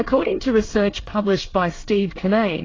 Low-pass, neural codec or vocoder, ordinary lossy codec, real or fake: 7.2 kHz; codec, 24 kHz, 1 kbps, SNAC; AAC, 32 kbps; fake